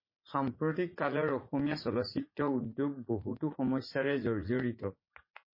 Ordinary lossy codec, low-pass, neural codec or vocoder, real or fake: MP3, 24 kbps; 5.4 kHz; vocoder, 44.1 kHz, 80 mel bands, Vocos; fake